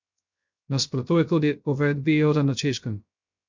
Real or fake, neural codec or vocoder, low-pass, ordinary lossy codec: fake; codec, 16 kHz, 0.3 kbps, FocalCodec; 7.2 kHz; MP3, 64 kbps